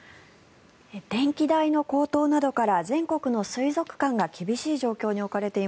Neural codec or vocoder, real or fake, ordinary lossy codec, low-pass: none; real; none; none